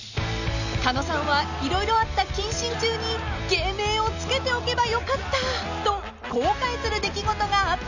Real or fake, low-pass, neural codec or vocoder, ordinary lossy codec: real; 7.2 kHz; none; none